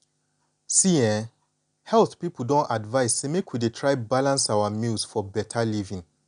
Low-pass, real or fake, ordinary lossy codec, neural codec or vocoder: 9.9 kHz; real; none; none